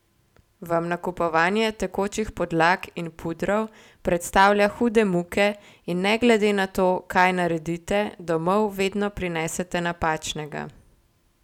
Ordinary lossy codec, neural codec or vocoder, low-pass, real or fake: none; none; 19.8 kHz; real